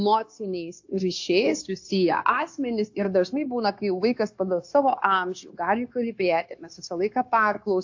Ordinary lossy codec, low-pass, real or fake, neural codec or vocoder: AAC, 48 kbps; 7.2 kHz; fake; codec, 16 kHz, 0.9 kbps, LongCat-Audio-Codec